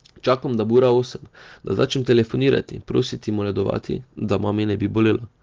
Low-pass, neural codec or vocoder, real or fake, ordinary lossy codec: 7.2 kHz; none; real; Opus, 16 kbps